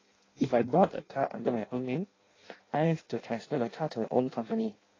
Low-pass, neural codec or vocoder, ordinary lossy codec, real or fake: 7.2 kHz; codec, 16 kHz in and 24 kHz out, 0.6 kbps, FireRedTTS-2 codec; AAC, 32 kbps; fake